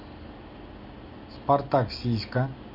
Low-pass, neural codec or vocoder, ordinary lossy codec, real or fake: 5.4 kHz; none; MP3, 32 kbps; real